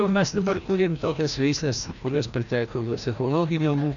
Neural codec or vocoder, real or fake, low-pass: codec, 16 kHz, 1 kbps, FreqCodec, larger model; fake; 7.2 kHz